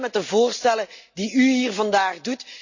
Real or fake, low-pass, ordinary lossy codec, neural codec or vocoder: real; 7.2 kHz; Opus, 64 kbps; none